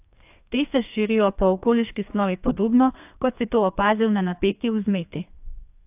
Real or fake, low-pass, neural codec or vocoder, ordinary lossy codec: fake; 3.6 kHz; codec, 32 kHz, 1.9 kbps, SNAC; AAC, 32 kbps